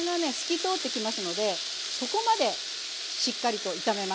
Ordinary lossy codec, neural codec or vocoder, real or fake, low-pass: none; none; real; none